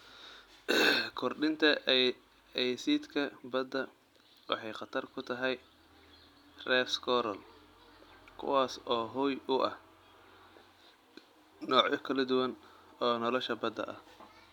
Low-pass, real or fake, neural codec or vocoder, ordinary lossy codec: 19.8 kHz; real; none; none